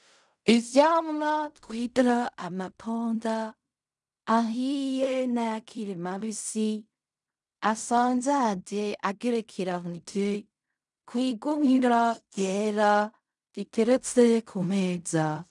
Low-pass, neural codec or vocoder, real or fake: 10.8 kHz; codec, 16 kHz in and 24 kHz out, 0.4 kbps, LongCat-Audio-Codec, fine tuned four codebook decoder; fake